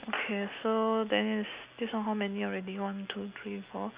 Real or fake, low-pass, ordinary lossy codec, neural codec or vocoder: real; 3.6 kHz; Opus, 64 kbps; none